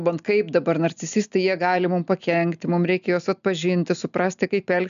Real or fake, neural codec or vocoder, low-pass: real; none; 7.2 kHz